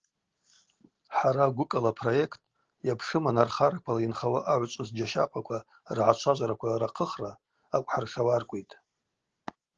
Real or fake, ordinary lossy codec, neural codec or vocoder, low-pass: real; Opus, 16 kbps; none; 7.2 kHz